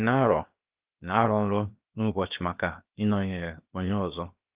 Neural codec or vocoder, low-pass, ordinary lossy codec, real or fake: codec, 16 kHz, 0.7 kbps, FocalCodec; 3.6 kHz; Opus, 32 kbps; fake